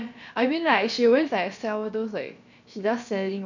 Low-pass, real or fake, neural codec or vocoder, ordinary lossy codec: 7.2 kHz; fake; codec, 16 kHz, about 1 kbps, DyCAST, with the encoder's durations; none